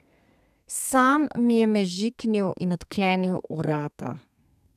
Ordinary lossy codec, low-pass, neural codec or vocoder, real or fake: none; 14.4 kHz; codec, 32 kHz, 1.9 kbps, SNAC; fake